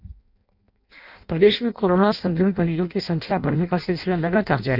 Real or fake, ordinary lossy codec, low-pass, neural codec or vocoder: fake; none; 5.4 kHz; codec, 16 kHz in and 24 kHz out, 0.6 kbps, FireRedTTS-2 codec